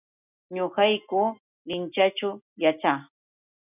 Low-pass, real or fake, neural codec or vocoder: 3.6 kHz; real; none